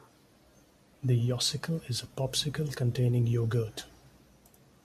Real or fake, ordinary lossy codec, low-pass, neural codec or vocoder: real; MP3, 64 kbps; 14.4 kHz; none